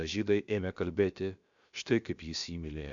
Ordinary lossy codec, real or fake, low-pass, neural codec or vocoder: MP3, 64 kbps; fake; 7.2 kHz; codec, 16 kHz, about 1 kbps, DyCAST, with the encoder's durations